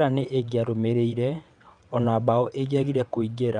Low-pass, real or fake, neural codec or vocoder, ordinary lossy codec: 9.9 kHz; fake; vocoder, 22.05 kHz, 80 mel bands, WaveNeXt; none